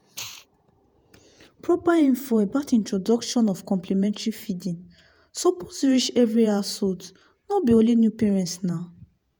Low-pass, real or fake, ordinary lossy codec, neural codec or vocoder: none; fake; none; vocoder, 48 kHz, 128 mel bands, Vocos